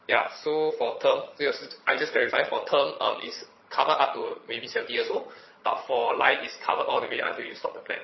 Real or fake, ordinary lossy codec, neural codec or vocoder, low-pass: fake; MP3, 24 kbps; vocoder, 22.05 kHz, 80 mel bands, HiFi-GAN; 7.2 kHz